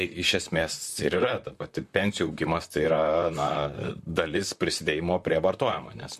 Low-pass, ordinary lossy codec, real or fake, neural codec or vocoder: 14.4 kHz; MP3, 64 kbps; fake; vocoder, 44.1 kHz, 128 mel bands, Pupu-Vocoder